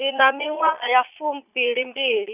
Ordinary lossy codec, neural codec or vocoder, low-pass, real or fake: none; vocoder, 22.05 kHz, 80 mel bands, Vocos; 3.6 kHz; fake